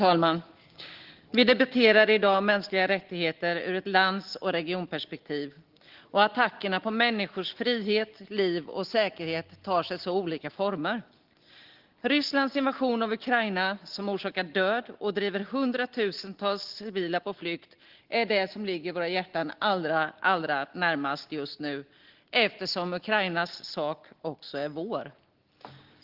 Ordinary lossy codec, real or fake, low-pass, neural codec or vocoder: Opus, 16 kbps; real; 5.4 kHz; none